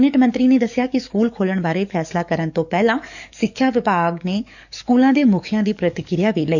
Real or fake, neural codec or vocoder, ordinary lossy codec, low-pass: fake; codec, 44.1 kHz, 7.8 kbps, DAC; none; 7.2 kHz